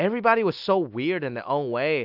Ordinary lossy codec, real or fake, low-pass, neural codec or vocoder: AAC, 48 kbps; real; 5.4 kHz; none